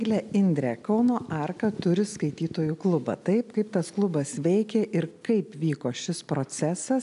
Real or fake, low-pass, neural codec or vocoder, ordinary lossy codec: real; 10.8 kHz; none; AAC, 96 kbps